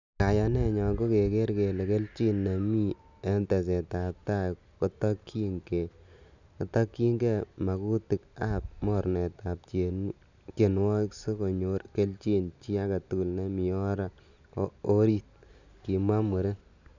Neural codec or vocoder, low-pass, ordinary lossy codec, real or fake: none; 7.2 kHz; none; real